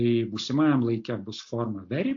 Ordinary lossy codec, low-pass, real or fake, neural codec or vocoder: AAC, 48 kbps; 7.2 kHz; real; none